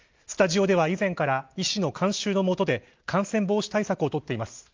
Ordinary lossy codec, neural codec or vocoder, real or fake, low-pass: Opus, 32 kbps; none; real; 7.2 kHz